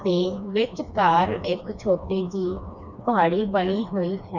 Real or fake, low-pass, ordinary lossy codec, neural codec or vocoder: fake; 7.2 kHz; none; codec, 16 kHz, 2 kbps, FreqCodec, smaller model